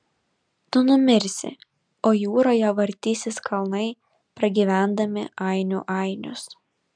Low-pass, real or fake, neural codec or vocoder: 9.9 kHz; real; none